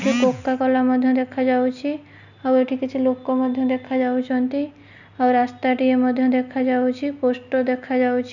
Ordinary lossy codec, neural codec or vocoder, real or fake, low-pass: none; none; real; 7.2 kHz